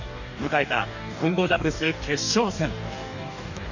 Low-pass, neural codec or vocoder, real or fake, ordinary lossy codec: 7.2 kHz; codec, 44.1 kHz, 2.6 kbps, DAC; fake; none